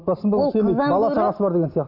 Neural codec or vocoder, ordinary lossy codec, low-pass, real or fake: none; none; 5.4 kHz; real